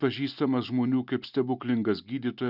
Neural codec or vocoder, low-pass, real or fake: none; 5.4 kHz; real